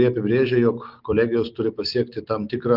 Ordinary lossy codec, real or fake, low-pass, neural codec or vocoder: Opus, 24 kbps; real; 5.4 kHz; none